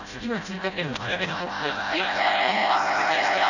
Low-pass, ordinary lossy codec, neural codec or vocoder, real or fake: 7.2 kHz; none; codec, 16 kHz, 0.5 kbps, FreqCodec, smaller model; fake